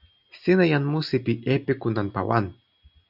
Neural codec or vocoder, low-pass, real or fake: none; 5.4 kHz; real